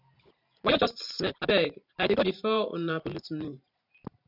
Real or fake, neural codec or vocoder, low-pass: fake; vocoder, 44.1 kHz, 128 mel bands every 512 samples, BigVGAN v2; 5.4 kHz